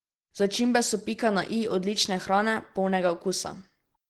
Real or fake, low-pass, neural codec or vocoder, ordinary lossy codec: real; 19.8 kHz; none; Opus, 16 kbps